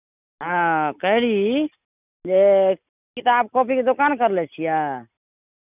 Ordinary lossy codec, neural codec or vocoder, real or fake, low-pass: none; none; real; 3.6 kHz